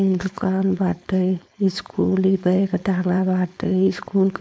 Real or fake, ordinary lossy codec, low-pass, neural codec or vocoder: fake; none; none; codec, 16 kHz, 4.8 kbps, FACodec